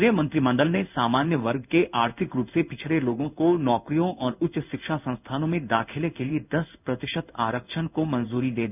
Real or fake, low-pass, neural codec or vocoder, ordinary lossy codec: fake; 3.6 kHz; codec, 16 kHz in and 24 kHz out, 1 kbps, XY-Tokenizer; none